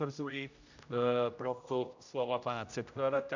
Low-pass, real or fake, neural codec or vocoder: 7.2 kHz; fake; codec, 16 kHz, 0.5 kbps, X-Codec, HuBERT features, trained on general audio